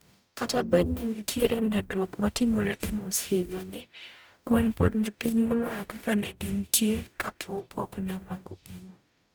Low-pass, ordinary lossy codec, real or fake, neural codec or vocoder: none; none; fake; codec, 44.1 kHz, 0.9 kbps, DAC